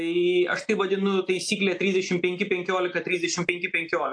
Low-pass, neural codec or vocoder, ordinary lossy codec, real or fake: 9.9 kHz; none; AAC, 64 kbps; real